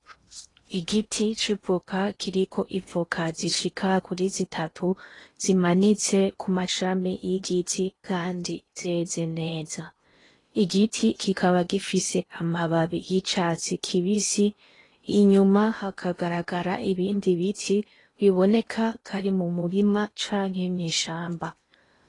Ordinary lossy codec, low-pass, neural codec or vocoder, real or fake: AAC, 32 kbps; 10.8 kHz; codec, 16 kHz in and 24 kHz out, 0.8 kbps, FocalCodec, streaming, 65536 codes; fake